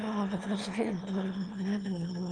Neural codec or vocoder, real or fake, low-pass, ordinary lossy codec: autoencoder, 22.05 kHz, a latent of 192 numbers a frame, VITS, trained on one speaker; fake; 9.9 kHz; Opus, 32 kbps